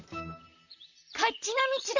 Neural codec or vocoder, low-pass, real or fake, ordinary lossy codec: vocoder, 44.1 kHz, 128 mel bands, Pupu-Vocoder; 7.2 kHz; fake; none